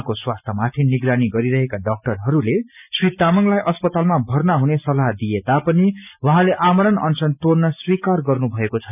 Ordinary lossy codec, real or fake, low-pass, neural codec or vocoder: none; real; 3.6 kHz; none